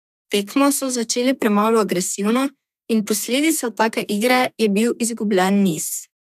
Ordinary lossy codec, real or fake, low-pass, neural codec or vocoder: none; fake; 14.4 kHz; codec, 32 kHz, 1.9 kbps, SNAC